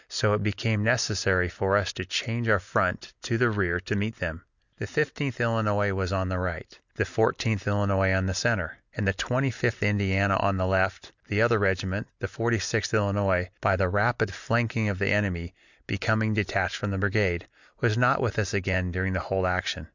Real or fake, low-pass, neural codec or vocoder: real; 7.2 kHz; none